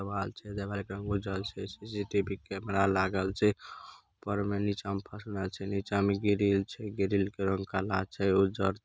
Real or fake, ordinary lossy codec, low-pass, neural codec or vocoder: real; none; none; none